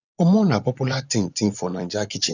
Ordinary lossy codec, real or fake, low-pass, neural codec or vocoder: none; fake; 7.2 kHz; vocoder, 24 kHz, 100 mel bands, Vocos